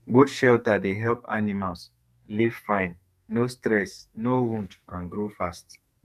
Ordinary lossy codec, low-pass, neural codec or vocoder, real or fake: none; 14.4 kHz; codec, 44.1 kHz, 2.6 kbps, SNAC; fake